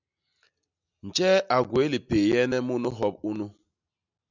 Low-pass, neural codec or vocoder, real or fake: 7.2 kHz; none; real